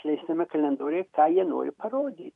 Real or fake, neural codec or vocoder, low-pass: fake; vocoder, 44.1 kHz, 128 mel bands every 256 samples, BigVGAN v2; 10.8 kHz